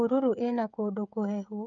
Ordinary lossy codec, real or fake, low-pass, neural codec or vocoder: none; fake; 7.2 kHz; codec, 16 kHz, 16 kbps, FreqCodec, smaller model